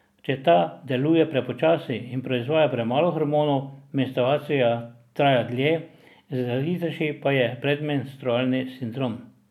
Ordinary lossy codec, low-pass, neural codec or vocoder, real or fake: none; 19.8 kHz; none; real